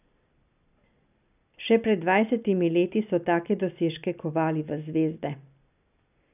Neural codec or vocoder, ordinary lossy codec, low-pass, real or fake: none; none; 3.6 kHz; real